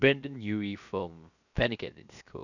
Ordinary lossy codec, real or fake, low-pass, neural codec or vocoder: none; fake; 7.2 kHz; codec, 16 kHz, 0.7 kbps, FocalCodec